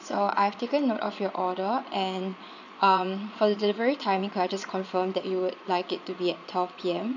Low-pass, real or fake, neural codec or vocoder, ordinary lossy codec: 7.2 kHz; fake; vocoder, 22.05 kHz, 80 mel bands, Vocos; none